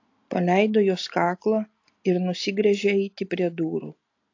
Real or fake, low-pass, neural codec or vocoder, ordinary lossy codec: real; 7.2 kHz; none; AAC, 48 kbps